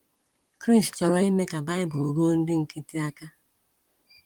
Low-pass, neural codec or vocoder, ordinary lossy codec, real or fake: 19.8 kHz; vocoder, 44.1 kHz, 128 mel bands, Pupu-Vocoder; Opus, 24 kbps; fake